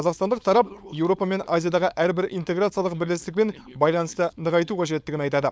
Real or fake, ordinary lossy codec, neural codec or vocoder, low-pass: fake; none; codec, 16 kHz, 4.8 kbps, FACodec; none